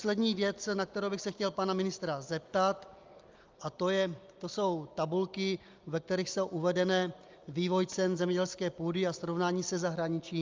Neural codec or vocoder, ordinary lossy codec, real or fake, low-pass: none; Opus, 24 kbps; real; 7.2 kHz